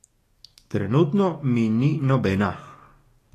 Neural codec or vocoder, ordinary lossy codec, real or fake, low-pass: codec, 44.1 kHz, 7.8 kbps, DAC; AAC, 48 kbps; fake; 14.4 kHz